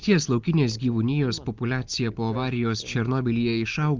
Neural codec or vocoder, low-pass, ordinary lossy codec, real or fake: none; 7.2 kHz; Opus, 32 kbps; real